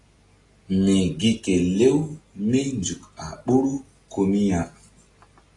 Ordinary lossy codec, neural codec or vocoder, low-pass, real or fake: AAC, 32 kbps; none; 10.8 kHz; real